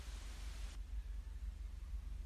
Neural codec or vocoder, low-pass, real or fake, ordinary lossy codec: none; 14.4 kHz; real; Opus, 64 kbps